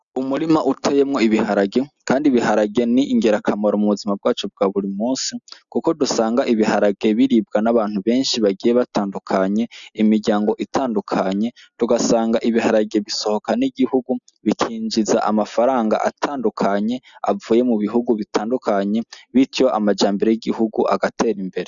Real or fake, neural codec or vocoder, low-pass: real; none; 7.2 kHz